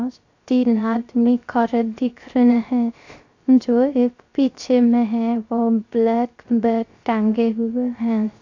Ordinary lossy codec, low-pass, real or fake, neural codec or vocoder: AAC, 48 kbps; 7.2 kHz; fake; codec, 16 kHz, 0.3 kbps, FocalCodec